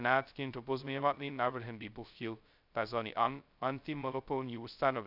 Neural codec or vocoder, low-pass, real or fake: codec, 16 kHz, 0.2 kbps, FocalCodec; 5.4 kHz; fake